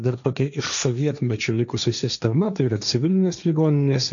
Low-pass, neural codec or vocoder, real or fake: 7.2 kHz; codec, 16 kHz, 1.1 kbps, Voila-Tokenizer; fake